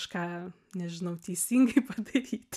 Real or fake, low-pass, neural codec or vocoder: real; 14.4 kHz; none